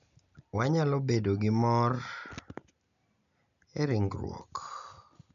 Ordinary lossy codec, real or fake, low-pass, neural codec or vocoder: none; real; 7.2 kHz; none